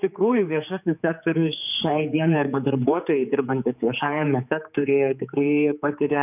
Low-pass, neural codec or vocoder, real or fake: 3.6 kHz; codec, 16 kHz, 4 kbps, X-Codec, HuBERT features, trained on general audio; fake